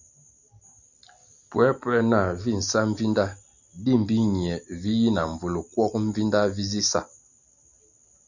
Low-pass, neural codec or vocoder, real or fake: 7.2 kHz; none; real